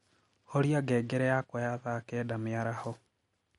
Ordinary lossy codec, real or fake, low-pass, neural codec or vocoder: MP3, 48 kbps; real; 14.4 kHz; none